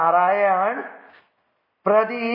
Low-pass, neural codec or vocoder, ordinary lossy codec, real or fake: 5.4 kHz; none; MP3, 24 kbps; real